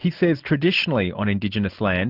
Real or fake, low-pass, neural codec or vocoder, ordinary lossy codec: real; 5.4 kHz; none; Opus, 24 kbps